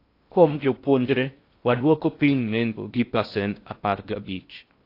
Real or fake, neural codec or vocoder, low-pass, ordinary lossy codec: fake; codec, 16 kHz in and 24 kHz out, 0.6 kbps, FocalCodec, streaming, 2048 codes; 5.4 kHz; AAC, 32 kbps